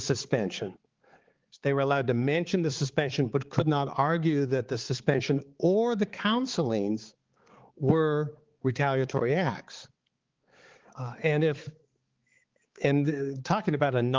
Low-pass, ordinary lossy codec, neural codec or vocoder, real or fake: 7.2 kHz; Opus, 16 kbps; codec, 16 kHz, 4 kbps, X-Codec, HuBERT features, trained on balanced general audio; fake